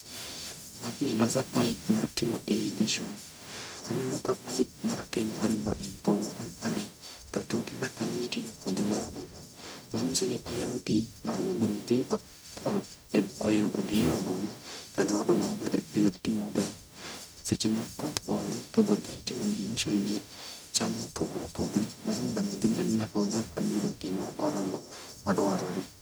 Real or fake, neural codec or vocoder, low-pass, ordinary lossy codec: fake; codec, 44.1 kHz, 0.9 kbps, DAC; none; none